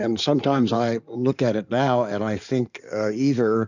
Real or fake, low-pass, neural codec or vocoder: fake; 7.2 kHz; codec, 16 kHz in and 24 kHz out, 2.2 kbps, FireRedTTS-2 codec